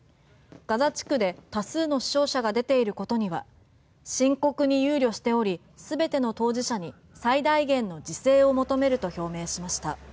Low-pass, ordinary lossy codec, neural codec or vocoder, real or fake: none; none; none; real